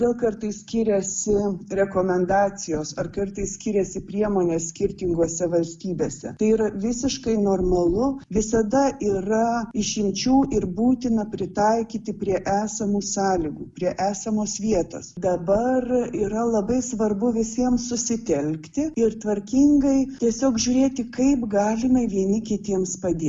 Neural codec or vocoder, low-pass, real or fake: none; 10.8 kHz; real